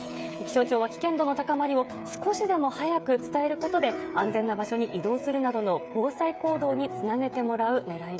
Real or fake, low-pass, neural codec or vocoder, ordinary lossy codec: fake; none; codec, 16 kHz, 8 kbps, FreqCodec, smaller model; none